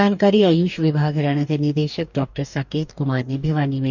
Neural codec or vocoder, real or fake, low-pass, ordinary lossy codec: codec, 44.1 kHz, 2.6 kbps, DAC; fake; 7.2 kHz; none